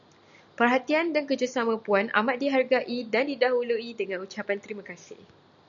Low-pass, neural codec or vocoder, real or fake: 7.2 kHz; none; real